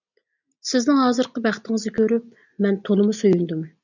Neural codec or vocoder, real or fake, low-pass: none; real; 7.2 kHz